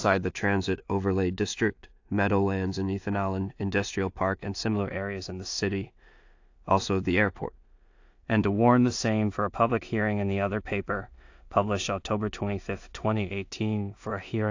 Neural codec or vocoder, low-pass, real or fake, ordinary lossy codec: codec, 16 kHz in and 24 kHz out, 0.4 kbps, LongCat-Audio-Codec, two codebook decoder; 7.2 kHz; fake; AAC, 48 kbps